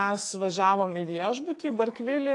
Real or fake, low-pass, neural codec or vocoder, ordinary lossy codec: fake; 10.8 kHz; codec, 44.1 kHz, 2.6 kbps, SNAC; AAC, 48 kbps